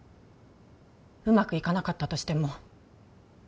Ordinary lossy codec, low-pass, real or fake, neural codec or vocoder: none; none; real; none